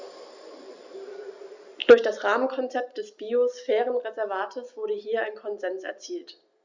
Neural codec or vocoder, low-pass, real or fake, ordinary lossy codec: none; 7.2 kHz; real; Opus, 64 kbps